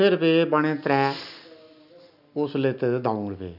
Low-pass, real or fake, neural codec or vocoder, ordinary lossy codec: 5.4 kHz; real; none; none